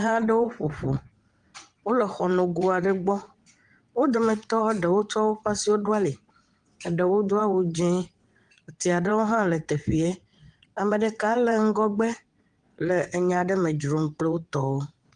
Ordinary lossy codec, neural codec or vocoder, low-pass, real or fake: Opus, 24 kbps; vocoder, 22.05 kHz, 80 mel bands, WaveNeXt; 9.9 kHz; fake